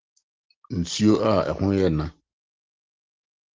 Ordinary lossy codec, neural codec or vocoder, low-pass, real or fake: Opus, 16 kbps; none; 7.2 kHz; real